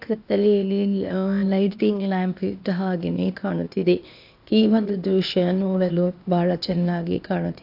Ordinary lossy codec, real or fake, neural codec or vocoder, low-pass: AAC, 48 kbps; fake; codec, 16 kHz, 0.8 kbps, ZipCodec; 5.4 kHz